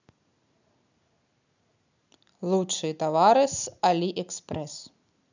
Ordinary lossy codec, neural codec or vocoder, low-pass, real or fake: none; none; 7.2 kHz; real